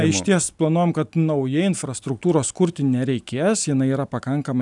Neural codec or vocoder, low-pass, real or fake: none; 10.8 kHz; real